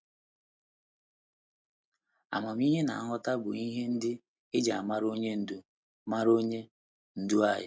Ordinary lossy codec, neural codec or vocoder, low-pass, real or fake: none; none; none; real